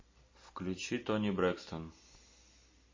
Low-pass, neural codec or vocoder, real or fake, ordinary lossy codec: 7.2 kHz; none; real; MP3, 32 kbps